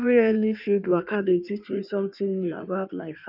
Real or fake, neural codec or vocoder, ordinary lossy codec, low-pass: fake; codec, 16 kHz in and 24 kHz out, 1.1 kbps, FireRedTTS-2 codec; none; 5.4 kHz